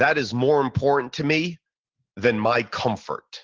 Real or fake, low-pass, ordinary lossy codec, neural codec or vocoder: real; 7.2 kHz; Opus, 16 kbps; none